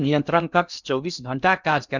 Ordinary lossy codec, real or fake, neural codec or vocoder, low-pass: none; fake; codec, 16 kHz in and 24 kHz out, 0.6 kbps, FocalCodec, streaming, 2048 codes; 7.2 kHz